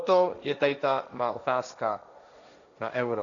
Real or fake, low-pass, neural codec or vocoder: fake; 7.2 kHz; codec, 16 kHz, 1.1 kbps, Voila-Tokenizer